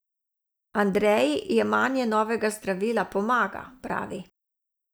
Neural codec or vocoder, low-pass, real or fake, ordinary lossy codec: none; none; real; none